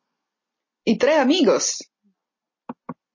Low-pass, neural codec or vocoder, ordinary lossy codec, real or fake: 7.2 kHz; none; MP3, 32 kbps; real